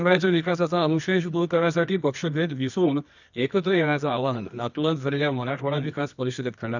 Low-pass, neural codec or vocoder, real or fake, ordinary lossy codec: 7.2 kHz; codec, 24 kHz, 0.9 kbps, WavTokenizer, medium music audio release; fake; none